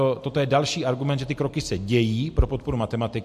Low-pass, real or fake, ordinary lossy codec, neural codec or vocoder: 14.4 kHz; real; MP3, 64 kbps; none